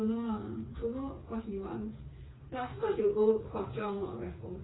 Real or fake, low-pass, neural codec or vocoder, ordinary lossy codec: fake; 7.2 kHz; codec, 16 kHz, 4 kbps, FreqCodec, smaller model; AAC, 16 kbps